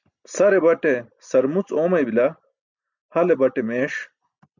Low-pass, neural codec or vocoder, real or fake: 7.2 kHz; none; real